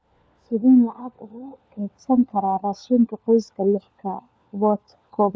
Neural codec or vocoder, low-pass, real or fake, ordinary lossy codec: codec, 16 kHz, 4 kbps, FunCodec, trained on LibriTTS, 50 frames a second; none; fake; none